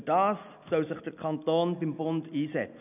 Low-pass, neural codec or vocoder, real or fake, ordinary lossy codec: 3.6 kHz; none; real; none